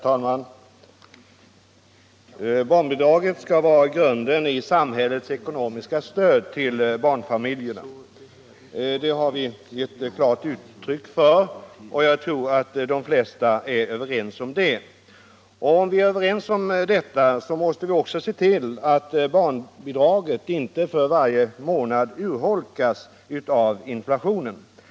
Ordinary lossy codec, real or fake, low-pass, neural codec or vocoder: none; real; none; none